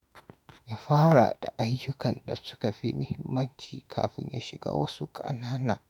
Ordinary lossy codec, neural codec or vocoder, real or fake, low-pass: none; autoencoder, 48 kHz, 32 numbers a frame, DAC-VAE, trained on Japanese speech; fake; 19.8 kHz